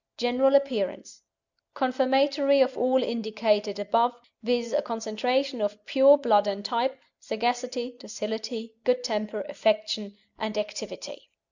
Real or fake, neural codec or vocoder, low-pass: real; none; 7.2 kHz